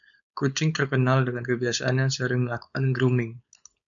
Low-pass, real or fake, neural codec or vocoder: 7.2 kHz; fake; codec, 16 kHz, 4.8 kbps, FACodec